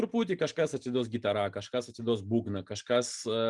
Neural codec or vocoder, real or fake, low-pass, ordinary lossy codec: none; real; 10.8 kHz; Opus, 32 kbps